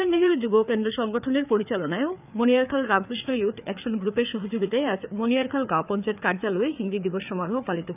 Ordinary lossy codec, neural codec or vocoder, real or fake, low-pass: none; codec, 16 kHz, 4 kbps, FreqCodec, larger model; fake; 3.6 kHz